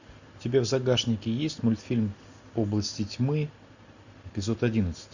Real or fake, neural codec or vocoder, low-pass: real; none; 7.2 kHz